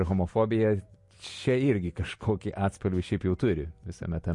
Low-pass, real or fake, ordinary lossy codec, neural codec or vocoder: 10.8 kHz; real; MP3, 48 kbps; none